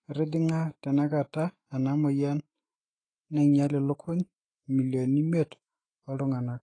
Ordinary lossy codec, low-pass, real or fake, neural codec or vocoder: AAC, 32 kbps; 9.9 kHz; fake; autoencoder, 48 kHz, 128 numbers a frame, DAC-VAE, trained on Japanese speech